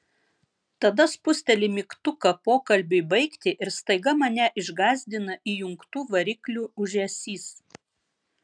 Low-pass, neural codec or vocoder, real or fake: 9.9 kHz; none; real